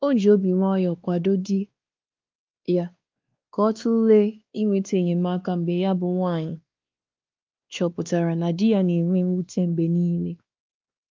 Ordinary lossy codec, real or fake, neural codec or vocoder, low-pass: Opus, 24 kbps; fake; codec, 16 kHz, 2 kbps, X-Codec, WavLM features, trained on Multilingual LibriSpeech; 7.2 kHz